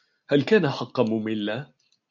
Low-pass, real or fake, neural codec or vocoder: 7.2 kHz; real; none